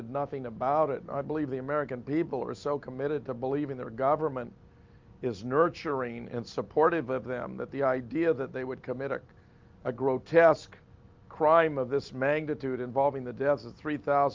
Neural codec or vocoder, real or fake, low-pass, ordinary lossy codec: none; real; 7.2 kHz; Opus, 32 kbps